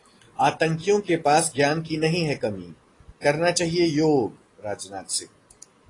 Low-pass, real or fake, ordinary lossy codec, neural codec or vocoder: 10.8 kHz; real; AAC, 32 kbps; none